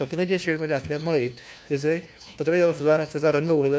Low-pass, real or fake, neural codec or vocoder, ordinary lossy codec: none; fake; codec, 16 kHz, 1 kbps, FunCodec, trained on LibriTTS, 50 frames a second; none